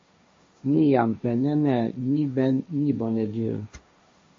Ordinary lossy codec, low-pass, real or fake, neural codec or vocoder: MP3, 32 kbps; 7.2 kHz; fake; codec, 16 kHz, 1.1 kbps, Voila-Tokenizer